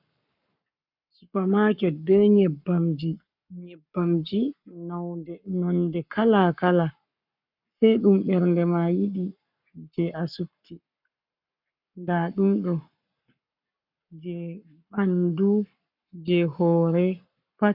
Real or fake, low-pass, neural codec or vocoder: fake; 5.4 kHz; codec, 44.1 kHz, 7.8 kbps, Pupu-Codec